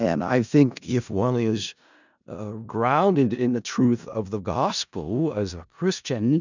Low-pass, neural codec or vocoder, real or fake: 7.2 kHz; codec, 16 kHz in and 24 kHz out, 0.4 kbps, LongCat-Audio-Codec, four codebook decoder; fake